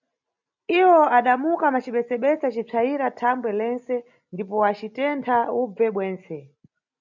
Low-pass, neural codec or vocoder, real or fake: 7.2 kHz; none; real